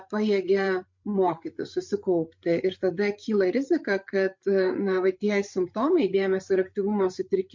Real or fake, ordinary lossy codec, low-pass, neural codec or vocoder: fake; MP3, 48 kbps; 7.2 kHz; codec, 16 kHz, 8 kbps, FreqCodec, larger model